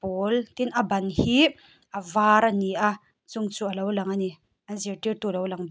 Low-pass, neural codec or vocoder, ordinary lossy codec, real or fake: none; none; none; real